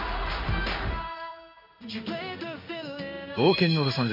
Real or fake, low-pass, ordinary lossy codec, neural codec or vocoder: fake; 5.4 kHz; MP3, 48 kbps; codec, 16 kHz in and 24 kHz out, 1 kbps, XY-Tokenizer